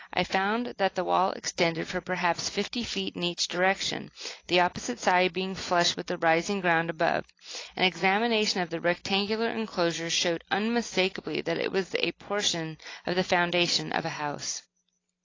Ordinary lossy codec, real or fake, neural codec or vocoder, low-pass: AAC, 32 kbps; real; none; 7.2 kHz